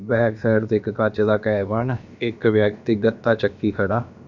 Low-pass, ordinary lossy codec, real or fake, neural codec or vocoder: 7.2 kHz; none; fake; codec, 16 kHz, about 1 kbps, DyCAST, with the encoder's durations